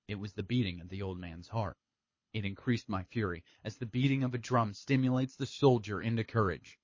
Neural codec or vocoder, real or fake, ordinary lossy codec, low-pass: codec, 24 kHz, 6 kbps, HILCodec; fake; MP3, 32 kbps; 7.2 kHz